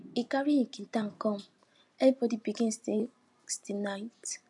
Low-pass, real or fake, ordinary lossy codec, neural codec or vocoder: 10.8 kHz; real; none; none